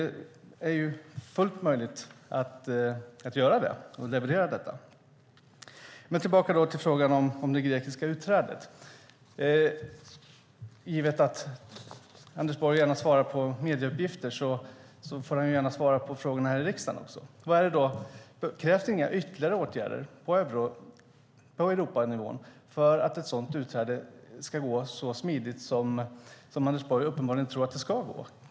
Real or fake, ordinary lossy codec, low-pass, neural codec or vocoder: real; none; none; none